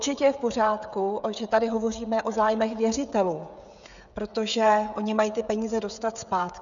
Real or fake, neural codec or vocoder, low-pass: fake; codec, 16 kHz, 16 kbps, FreqCodec, smaller model; 7.2 kHz